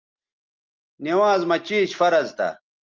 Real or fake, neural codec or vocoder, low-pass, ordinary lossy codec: real; none; 7.2 kHz; Opus, 32 kbps